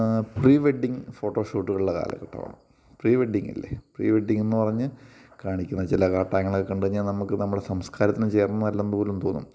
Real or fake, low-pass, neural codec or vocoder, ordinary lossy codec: real; none; none; none